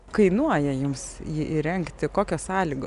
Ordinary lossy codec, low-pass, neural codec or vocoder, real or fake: MP3, 96 kbps; 10.8 kHz; none; real